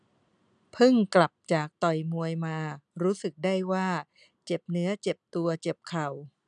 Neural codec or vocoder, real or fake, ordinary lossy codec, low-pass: none; real; none; 9.9 kHz